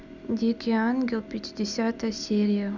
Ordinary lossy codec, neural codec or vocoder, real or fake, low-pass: none; none; real; 7.2 kHz